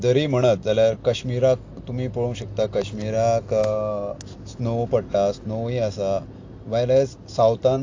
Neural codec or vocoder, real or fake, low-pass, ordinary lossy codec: none; real; 7.2 kHz; AAC, 48 kbps